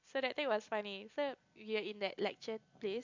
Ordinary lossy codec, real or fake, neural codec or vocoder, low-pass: MP3, 64 kbps; real; none; 7.2 kHz